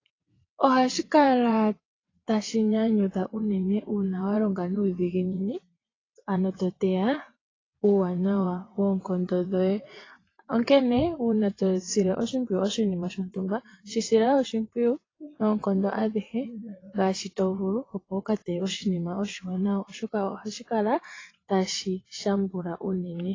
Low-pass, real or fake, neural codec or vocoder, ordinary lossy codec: 7.2 kHz; fake; vocoder, 22.05 kHz, 80 mel bands, WaveNeXt; AAC, 32 kbps